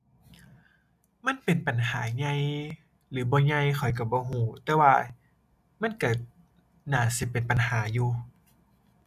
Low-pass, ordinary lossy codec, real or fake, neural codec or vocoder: 14.4 kHz; none; real; none